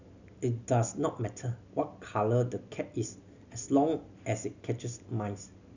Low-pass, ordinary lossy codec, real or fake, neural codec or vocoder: 7.2 kHz; none; real; none